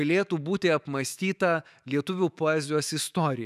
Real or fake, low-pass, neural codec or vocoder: fake; 14.4 kHz; autoencoder, 48 kHz, 128 numbers a frame, DAC-VAE, trained on Japanese speech